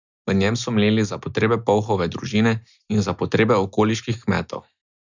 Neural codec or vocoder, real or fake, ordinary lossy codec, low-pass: none; real; none; 7.2 kHz